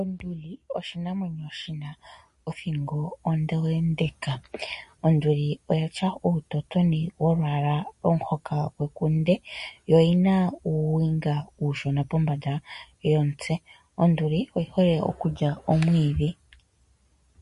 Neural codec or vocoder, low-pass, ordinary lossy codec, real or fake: none; 14.4 kHz; MP3, 48 kbps; real